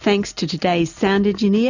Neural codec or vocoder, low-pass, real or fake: none; 7.2 kHz; real